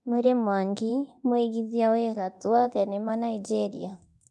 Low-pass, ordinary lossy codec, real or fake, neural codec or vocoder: none; none; fake; codec, 24 kHz, 0.9 kbps, DualCodec